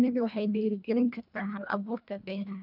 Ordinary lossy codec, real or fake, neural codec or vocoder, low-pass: none; fake; codec, 24 kHz, 1.5 kbps, HILCodec; 5.4 kHz